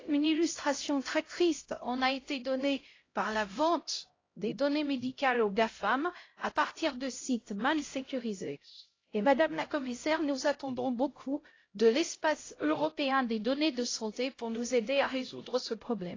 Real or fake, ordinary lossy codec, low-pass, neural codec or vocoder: fake; AAC, 32 kbps; 7.2 kHz; codec, 16 kHz, 0.5 kbps, X-Codec, HuBERT features, trained on LibriSpeech